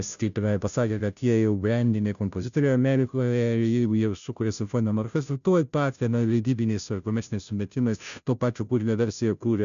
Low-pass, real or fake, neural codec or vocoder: 7.2 kHz; fake; codec, 16 kHz, 0.5 kbps, FunCodec, trained on Chinese and English, 25 frames a second